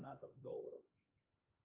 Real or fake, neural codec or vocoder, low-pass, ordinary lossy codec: fake; codec, 16 kHz, 2 kbps, X-Codec, HuBERT features, trained on LibriSpeech; 3.6 kHz; Opus, 32 kbps